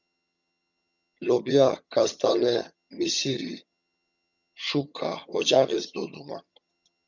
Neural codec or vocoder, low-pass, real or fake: vocoder, 22.05 kHz, 80 mel bands, HiFi-GAN; 7.2 kHz; fake